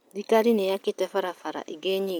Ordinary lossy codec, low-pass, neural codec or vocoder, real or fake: none; none; none; real